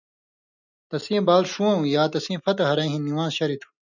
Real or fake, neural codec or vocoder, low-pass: real; none; 7.2 kHz